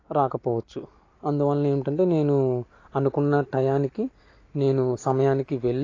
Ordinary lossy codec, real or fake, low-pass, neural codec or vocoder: AAC, 32 kbps; real; 7.2 kHz; none